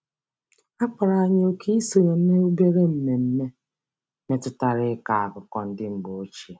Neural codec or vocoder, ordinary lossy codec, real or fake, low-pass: none; none; real; none